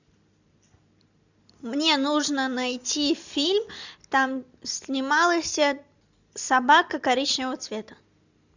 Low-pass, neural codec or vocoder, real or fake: 7.2 kHz; none; real